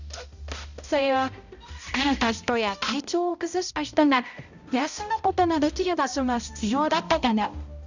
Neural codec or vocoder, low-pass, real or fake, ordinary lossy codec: codec, 16 kHz, 0.5 kbps, X-Codec, HuBERT features, trained on balanced general audio; 7.2 kHz; fake; none